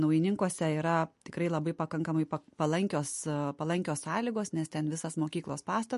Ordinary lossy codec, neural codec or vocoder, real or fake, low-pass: MP3, 48 kbps; none; real; 14.4 kHz